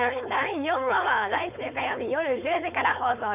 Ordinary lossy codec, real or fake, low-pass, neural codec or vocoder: none; fake; 3.6 kHz; codec, 16 kHz, 4.8 kbps, FACodec